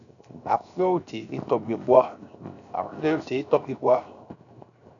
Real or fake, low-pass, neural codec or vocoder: fake; 7.2 kHz; codec, 16 kHz, 0.7 kbps, FocalCodec